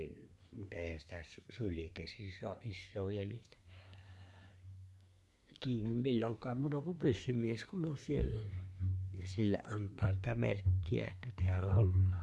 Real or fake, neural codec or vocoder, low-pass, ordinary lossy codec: fake; codec, 24 kHz, 1 kbps, SNAC; none; none